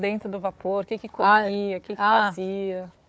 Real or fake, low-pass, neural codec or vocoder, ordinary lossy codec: fake; none; codec, 16 kHz, 4 kbps, FunCodec, trained on Chinese and English, 50 frames a second; none